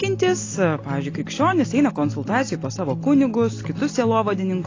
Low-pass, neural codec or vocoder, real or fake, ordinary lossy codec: 7.2 kHz; none; real; AAC, 32 kbps